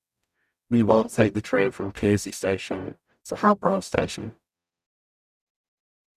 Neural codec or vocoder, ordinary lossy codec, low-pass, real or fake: codec, 44.1 kHz, 0.9 kbps, DAC; none; 14.4 kHz; fake